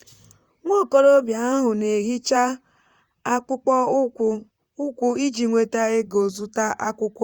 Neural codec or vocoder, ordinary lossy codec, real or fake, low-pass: vocoder, 44.1 kHz, 128 mel bands, Pupu-Vocoder; Opus, 64 kbps; fake; 19.8 kHz